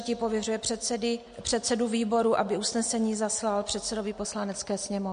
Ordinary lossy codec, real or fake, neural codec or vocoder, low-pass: MP3, 48 kbps; real; none; 9.9 kHz